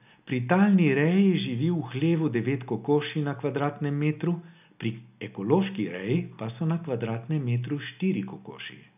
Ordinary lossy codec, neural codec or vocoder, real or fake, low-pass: none; none; real; 3.6 kHz